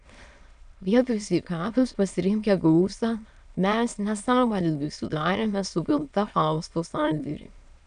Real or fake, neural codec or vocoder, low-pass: fake; autoencoder, 22.05 kHz, a latent of 192 numbers a frame, VITS, trained on many speakers; 9.9 kHz